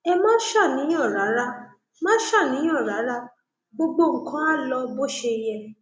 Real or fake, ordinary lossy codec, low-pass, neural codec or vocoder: real; none; none; none